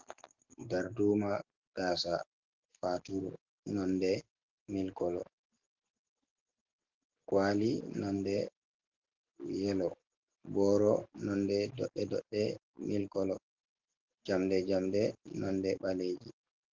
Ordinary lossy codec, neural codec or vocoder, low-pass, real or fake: Opus, 16 kbps; none; 7.2 kHz; real